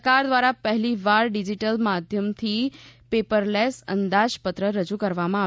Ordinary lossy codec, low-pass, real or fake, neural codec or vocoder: none; none; real; none